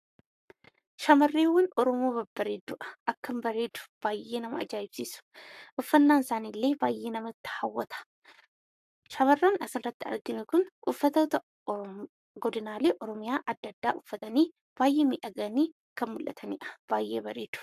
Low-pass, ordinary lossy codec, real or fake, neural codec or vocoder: 14.4 kHz; AAC, 96 kbps; fake; codec, 44.1 kHz, 7.8 kbps, Pupu-Codec